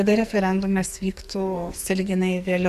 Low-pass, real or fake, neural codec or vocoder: 14.4 kHz; fake; codec, 44.1 kHz, 2.6 kbps, SNAC